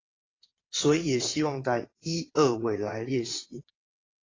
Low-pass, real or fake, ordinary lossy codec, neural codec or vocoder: 7.2 kHz; fake; AAC, 32 kbps; vocoder, 22.05 kHz, 80 mel bands, WaveNeXt